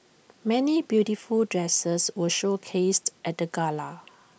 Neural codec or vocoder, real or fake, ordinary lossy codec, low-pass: none; real; none; none